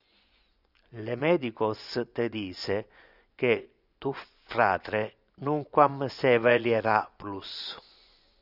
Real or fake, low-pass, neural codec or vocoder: fake; 5.4 kHz; vocoder, 24 kHz, 100 mel bands, Vocos